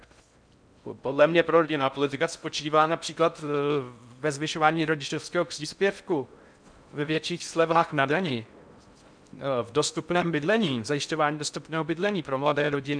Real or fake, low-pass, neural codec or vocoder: fake; 9.9 kHz; codec, 16 kHz in and 24 kHz out, 0.6 kbps, FocalCodec, streaming, 4096 codes